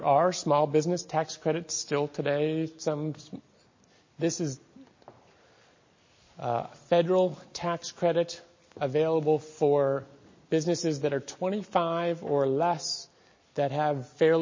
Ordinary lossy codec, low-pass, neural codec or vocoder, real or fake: MP3, 32 kbps; 7.2 kHz; none; real